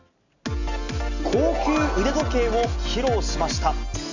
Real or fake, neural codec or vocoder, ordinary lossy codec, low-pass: real; none; none; 7.2 kHz